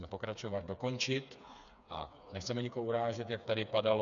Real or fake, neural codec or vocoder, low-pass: fake; codec, 16 kHz, 4 kbps, FreqCodec, smaller model; 7.2 kHz